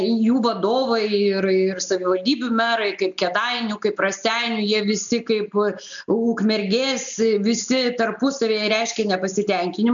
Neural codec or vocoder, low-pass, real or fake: none; 7.2 kHz; real